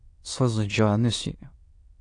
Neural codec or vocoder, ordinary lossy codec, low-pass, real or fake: autoencoder, 22.05 kHz, a latent of 192 numbers a frame, VITS, trained on many speakers; AAC, 48 kbps; 9.9 kHz; fake